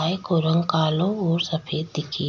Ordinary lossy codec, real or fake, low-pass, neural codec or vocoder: none; real; 7.2 kHz; none